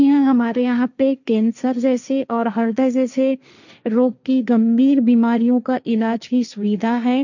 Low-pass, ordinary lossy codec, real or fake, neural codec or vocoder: 7.2 kHz; none; fake; codec, 16 kHz, 1.1 kbps, Voila-Tokenizer